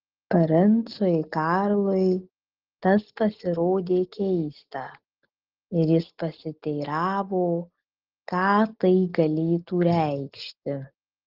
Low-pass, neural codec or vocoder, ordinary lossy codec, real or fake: 5.4 kHz; none; Opus, 16 kbps; real